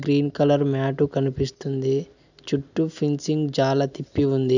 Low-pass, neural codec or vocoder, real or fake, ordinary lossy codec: 7.2 kHz; none; real; none